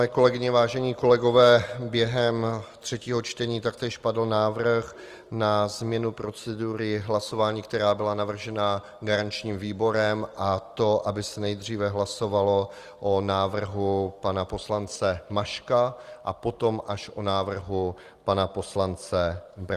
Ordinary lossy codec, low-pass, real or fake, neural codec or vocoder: Opus, 24 kbps; 14.4 kHz; real; none